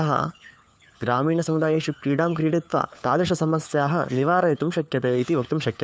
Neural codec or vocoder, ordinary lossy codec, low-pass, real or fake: codec, 16 kHz, 4 kbps, FunCodec, trained on LibriTTS, 50 frames a second; none; none; fake